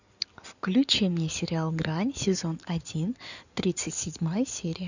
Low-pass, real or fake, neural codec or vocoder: 7.2 kHz; fake; codec, 16 kHz in and 24 kHz out, 2.2 kbps, FireRedTTS-2 codec